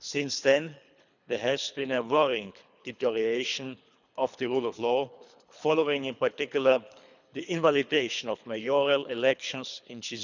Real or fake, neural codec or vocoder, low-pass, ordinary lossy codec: fake; codec, 24 kHz, 3 kbps, HILCodec; 7.2 kHz; none